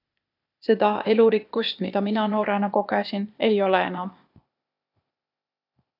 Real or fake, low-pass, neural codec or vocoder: fake; 5.4 kHz; codec, 16 kHz, 0.8 kbps, ZipCodec